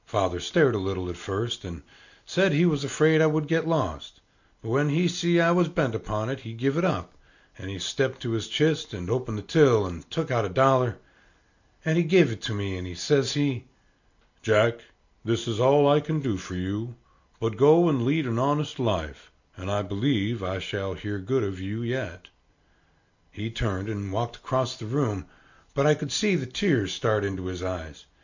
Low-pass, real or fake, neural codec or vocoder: 7.2 kHz; real; none